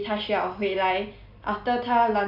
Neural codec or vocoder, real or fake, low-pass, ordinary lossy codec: none; real; 5.4 kHz; none